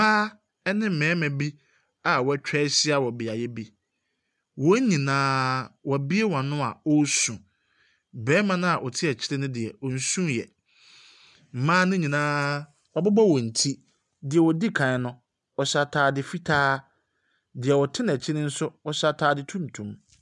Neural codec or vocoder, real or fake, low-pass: none; real; 10.8 kHz